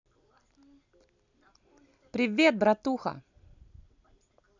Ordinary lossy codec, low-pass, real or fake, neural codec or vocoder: none; 7.2 kHz; real; none